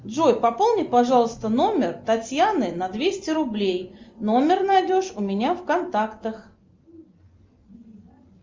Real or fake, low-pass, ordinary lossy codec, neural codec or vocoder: real; 7.2 kHz; Opus, 32 kbps; none